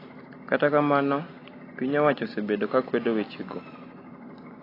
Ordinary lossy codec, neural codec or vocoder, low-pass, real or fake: AAC, 24 kbps; none; 5.4 kHz; real